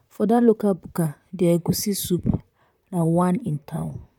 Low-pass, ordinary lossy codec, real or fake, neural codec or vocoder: 19.8 kHz; none; fake; vocoder, 44.1 kHz, 128 mel bands, Pupu-Vocoder